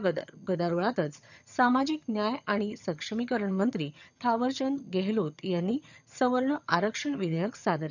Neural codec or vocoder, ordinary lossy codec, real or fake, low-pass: vocoder, 22.05 kHz, 80 mel bands, HiFi-GAN; none; fake; 7.2 kHz